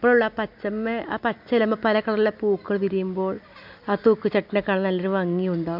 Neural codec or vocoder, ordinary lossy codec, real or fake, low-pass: none; MP3, 48 kbps; real; 5.4 kHz